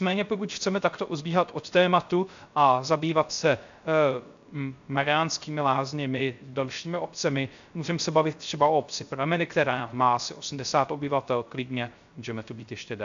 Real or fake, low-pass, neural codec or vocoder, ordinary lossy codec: fake; 7.2 kHz; codec, 16 kHz, 0.3 kbps, FocalCodec; AAC, 64 kbps